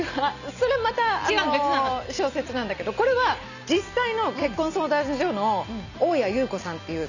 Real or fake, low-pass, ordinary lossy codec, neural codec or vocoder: real; 7.2 kHz; none; none